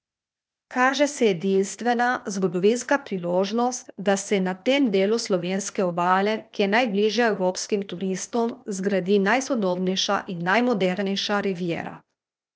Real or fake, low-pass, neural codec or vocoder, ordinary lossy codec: fake; none; codec, 16 kHz, 0.8 kbps, ZipCodec; none